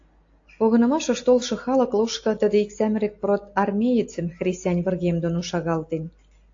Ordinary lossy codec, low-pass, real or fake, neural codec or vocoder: AAC, 48 kbps; 7.2 kHz; real; none